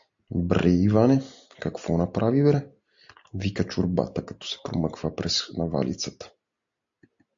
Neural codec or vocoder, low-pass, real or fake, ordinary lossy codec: none; 7.2 kHz; real; AAC, 64 kbps